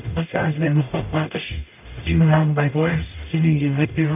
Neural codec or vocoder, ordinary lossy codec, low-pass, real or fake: codec, 44.1 kHz, 0.9 kbps, DAC; none; 3.6 kHz; fake